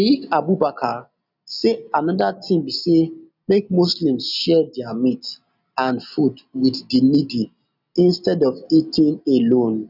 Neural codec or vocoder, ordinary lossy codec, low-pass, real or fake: none; none; 5.4 kHz; real